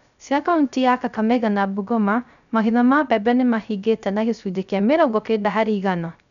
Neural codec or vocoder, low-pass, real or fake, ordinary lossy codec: codec, 16 kHz, 0.3 kbps, FocalCodec; 7.2 kHz; fake; none